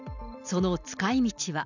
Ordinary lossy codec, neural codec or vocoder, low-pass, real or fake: none; none; 7.2 kHz; real